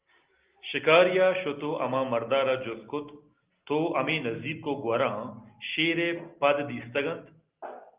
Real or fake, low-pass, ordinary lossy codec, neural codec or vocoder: real; 3.6 kHz; Opus, 32 kbps; none